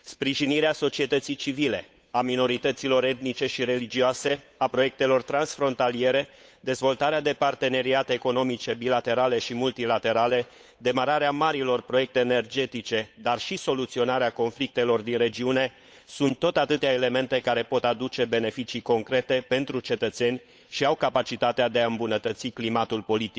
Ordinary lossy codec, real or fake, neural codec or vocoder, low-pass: none; fake; codec, 16 kHz, 8 kbps, FunCodec, trained on Chinese and English, 25 frames a second; none